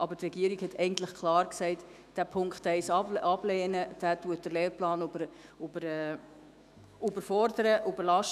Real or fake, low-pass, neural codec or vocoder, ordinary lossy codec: fake; 14.4 kHz; autoencoder, 48 kHz, 128 numbers a frame, DAC-VAE, trained on Japanese speech; none